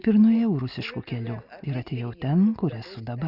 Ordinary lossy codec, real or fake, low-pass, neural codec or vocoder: Opus, 64 kbps; real; 5.4 kHz; none